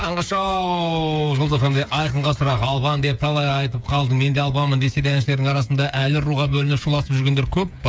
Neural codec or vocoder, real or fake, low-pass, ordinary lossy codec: codec, 16 kHz, 8 kbps, FreqCodec, smaller model; fake; none; none